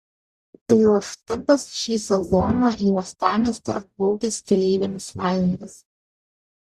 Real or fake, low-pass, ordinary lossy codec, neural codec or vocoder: fake; 14.4 kHz; Opus, 64 kbps; codec, 44.1 kHz, 0.9 kbps, DAC